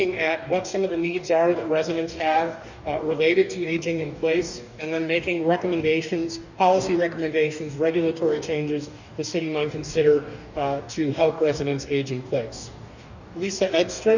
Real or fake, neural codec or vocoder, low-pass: fake; codec, 44.1 kHz, 2.6 kbps, DAC; 7.2 kHz